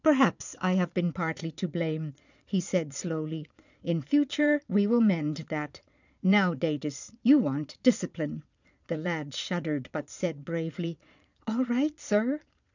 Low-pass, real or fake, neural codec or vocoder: 7.2 kHz; fake; vocoder, 22.05 kHz, 80 mel bands, Vocos